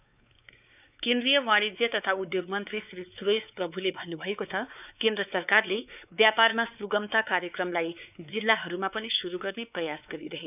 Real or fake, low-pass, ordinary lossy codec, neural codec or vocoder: fake; 3.6 kHz; none; codec, 16 kHz, 4 kbps, X-Codec, WavLM features, trained on Multilingual LibriSpeech